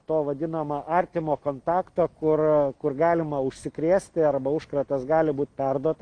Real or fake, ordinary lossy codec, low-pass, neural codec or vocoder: real; Opus, 24 kbps; 9.9 kHz; none